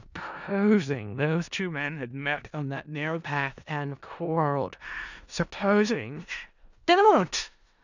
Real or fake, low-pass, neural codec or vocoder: fake; 7.2 kHz; codec, 16 kHz in and 24 kHz out, 0.4 kbps, LongCat-Audio-Codec, four codebook decoder